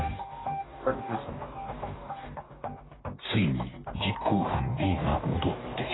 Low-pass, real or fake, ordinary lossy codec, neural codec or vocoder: 7.2 kHz; fake; AAC, 16 kbps; codec, 44.1 kHz, 2.6 kbps, DAC